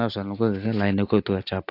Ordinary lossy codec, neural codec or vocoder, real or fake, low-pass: AAC, 32 kbps; none; real; 5.4 kHz